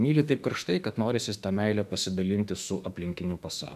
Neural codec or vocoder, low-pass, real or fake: autoencoder, 48 kHz, 32 numbers a frame, DAC-VAE, trained on Japanese speech; 14.4 kHz; fake